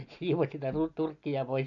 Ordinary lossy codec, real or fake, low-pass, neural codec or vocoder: none; real; 7.2 kHz; none